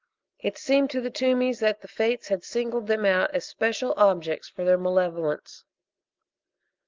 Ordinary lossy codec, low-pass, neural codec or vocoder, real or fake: Opus, 24 kbps; 7.2 kHz; none; real